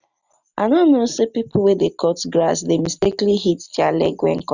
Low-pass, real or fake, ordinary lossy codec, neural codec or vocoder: 7.2 kHz; real; none; none